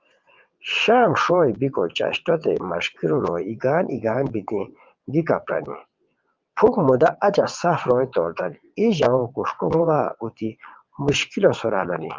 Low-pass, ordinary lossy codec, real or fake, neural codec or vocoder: 7.2 kHz; Opus, 24 kbps; fake; vocoder, 22.05 kHz, 80 mel bands, WaveNeXt